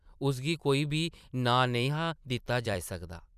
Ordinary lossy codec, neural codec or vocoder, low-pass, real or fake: none; vocoder, 44.1 kHz, 128 mel bands every 256 samples, BigVGAN v2; 14.4 kHz; fake